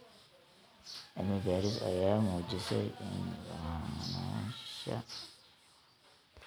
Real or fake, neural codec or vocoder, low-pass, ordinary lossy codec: real; none; none; none